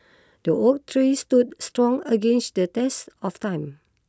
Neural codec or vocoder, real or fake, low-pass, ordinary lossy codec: none; real; none; none